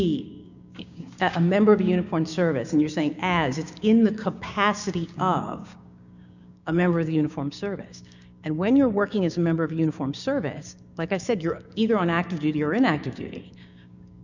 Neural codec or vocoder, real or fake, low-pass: codec, 16 kHz, 6 kbps, DAC; fake; 7.2 kHz